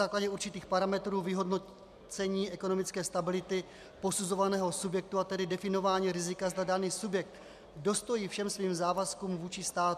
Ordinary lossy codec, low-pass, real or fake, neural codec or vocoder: MP3, 96 kbps; 14.4 kHz; real; none